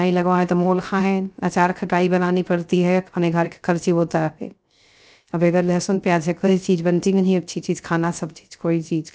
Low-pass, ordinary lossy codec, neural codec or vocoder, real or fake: none; none; codec, 16 kHz, 0.3 kbps, FocalCodec; fake